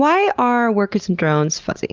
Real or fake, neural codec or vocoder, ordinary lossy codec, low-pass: real; none; Opus, 24 kbps; 7.2 kHz